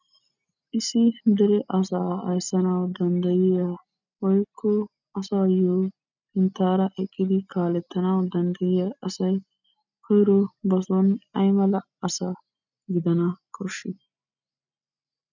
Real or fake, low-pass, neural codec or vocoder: real; 7.2 kHz; none